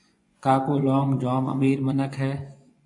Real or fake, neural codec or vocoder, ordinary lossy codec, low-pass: fake; vocoder, 24 kHz, 100 mel bands, Vocos; AAC, 64 kbps; 10.8 kHz